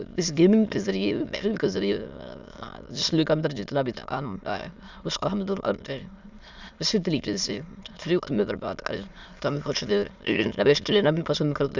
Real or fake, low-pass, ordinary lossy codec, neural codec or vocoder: fake; 7.2 kHz; Opus, 64 kbps; autoencoder, 22.05 kHz, a latent of 192 numbers a frame, VITS, trained on many speakers